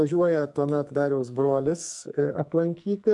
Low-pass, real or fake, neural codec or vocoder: 10.8 kHz; fake; codec, 44.1 kHz, 2.6 kbps, SNAC